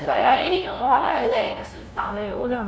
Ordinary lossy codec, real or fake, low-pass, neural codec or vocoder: none; fake; none; codec, 16 kHz, 0.5 kbps, FunCodec, trained on LibriTTS, 25 frames a second